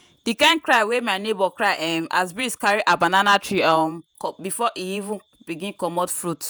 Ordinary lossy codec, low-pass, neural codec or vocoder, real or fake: none; none; vocoder, 48 kHz, 128 mel bands, Vocos; fake